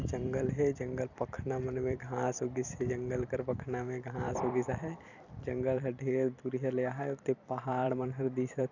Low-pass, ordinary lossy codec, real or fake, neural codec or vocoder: 7.2 kHz; none; fake; vocoder, 44.1 kHz, 128 mel bands every 256 samples, BigVGAN v2